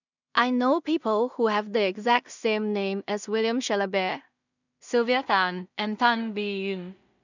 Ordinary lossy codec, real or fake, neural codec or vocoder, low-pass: none; fake; codec, 16 kHz in and 24 kHz out, 0.4 kbps, LongCat-Audio-Codec, two codebook decoder; 7.2 kHz